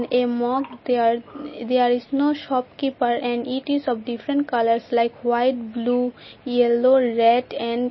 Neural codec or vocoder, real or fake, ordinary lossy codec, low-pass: none; real; MP3, 24 kbps; 7.2 kHz